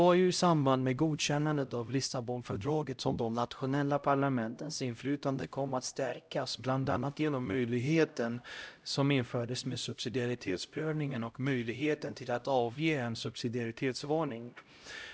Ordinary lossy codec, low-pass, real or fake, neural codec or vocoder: none; none; fake; codec, 16 kHz, 0.5 kbps, X-Codec, HuBERT features, trained on LibriSpeech